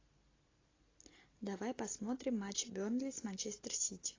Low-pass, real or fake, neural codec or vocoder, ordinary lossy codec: 7.2 kHz; real; none; AAC, 32 kbps